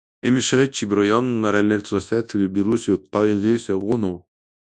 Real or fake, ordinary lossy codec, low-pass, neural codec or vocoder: fake; MP3, 96 kbps; 10.8 kHz; codec, 24 kHz, 0.9 kbps, WavTokenizer, large speech release